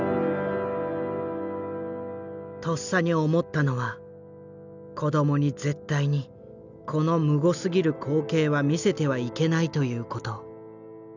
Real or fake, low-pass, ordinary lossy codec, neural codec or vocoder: real; 7.2 kHz; none; none